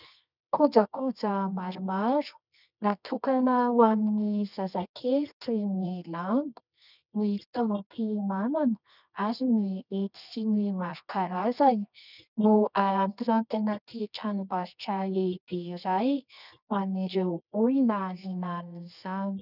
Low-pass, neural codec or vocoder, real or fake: 5.4 kHz; codec, 24 kHz, 0.9 kbps, WavTokenizer, medium music audio release; fake